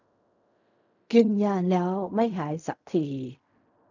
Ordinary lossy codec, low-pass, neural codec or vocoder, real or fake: none; 7.2 kHz; codec, 16 kHz in and 24 kHz out, 0.4 kbps, LongCat-Audio-Codec, fine tuned four codebook decoder; fake